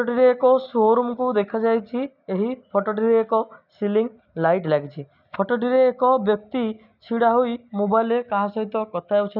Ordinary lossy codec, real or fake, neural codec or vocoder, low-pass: none; real; none; 5.4 kHz